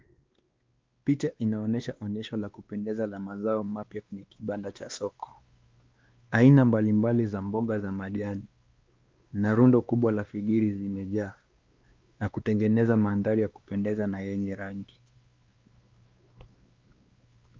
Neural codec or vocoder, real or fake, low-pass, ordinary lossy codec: codec, 16 kHz, 2 kbps, X-Codec, WavLM features, trained on Multilingual LibriSpeech; fake; 7.2 kHz; Opus, 24 kbps